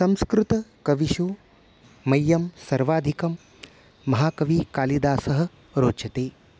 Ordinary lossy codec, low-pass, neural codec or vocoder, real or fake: none; none; none; real